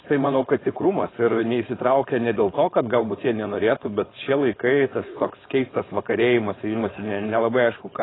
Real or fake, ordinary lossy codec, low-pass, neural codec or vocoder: fake; AAC, 16 kbps; 7.2 kHz; codec, 16 kHz, 4.8 kbps, FACodec